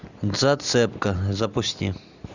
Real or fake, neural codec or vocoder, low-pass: real; none; 7.2 kHz